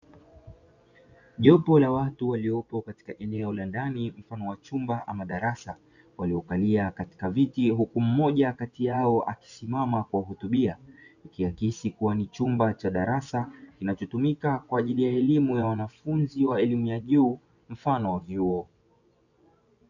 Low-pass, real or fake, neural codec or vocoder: 7.2 kHz; fake; vocoder, 24 kHz, 100 mel bands, Vocos